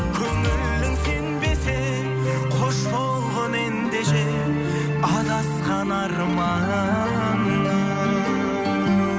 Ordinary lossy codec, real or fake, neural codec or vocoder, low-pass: none; real; none; none